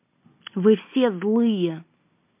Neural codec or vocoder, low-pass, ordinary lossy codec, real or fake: none; 3.6 kHz; MP3, 32 kbps; real